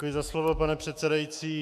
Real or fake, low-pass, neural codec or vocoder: fake; 14.4 kHz; autoencoder, 48 kHz, 128 numbers a frame, DAC-VAE, trained on Japanese speech